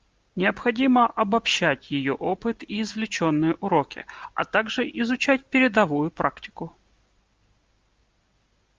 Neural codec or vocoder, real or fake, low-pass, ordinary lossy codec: none; real; 7.2 kHz; Opus, 24 kbps